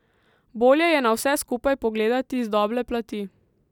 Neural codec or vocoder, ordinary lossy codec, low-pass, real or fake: none; none; 19.8 kHz; real